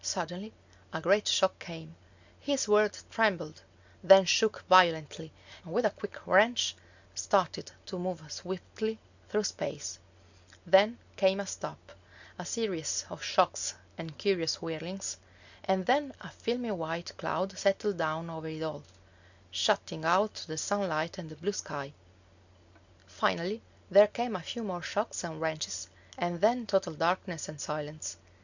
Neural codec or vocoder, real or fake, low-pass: none; real; 7.2 kHz